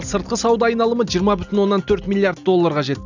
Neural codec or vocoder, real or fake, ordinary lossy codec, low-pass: none; real; none; 7.2 kHz